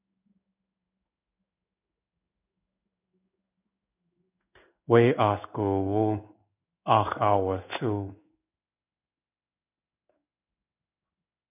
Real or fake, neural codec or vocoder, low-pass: fake; codec, 16 kHz in and 24 kHz out, 1 kbps, XY-Tokenizer; 3.6 kHz